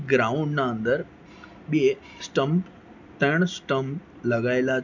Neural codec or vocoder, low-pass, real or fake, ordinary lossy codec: none; 7.2 kHz; real; none